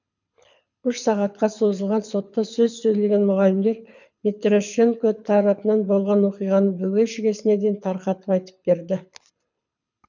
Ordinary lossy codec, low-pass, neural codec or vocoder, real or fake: none; 7.2 kHz; codec, 24 kHz, 6 kbps, HILCodec; fake